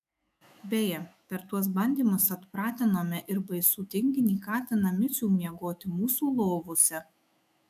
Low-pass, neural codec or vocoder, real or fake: 14.4 kHz; autoencoder, 48 kHz, 128 numbers a frame, DAC-VAE, trained on Japanese speech; fake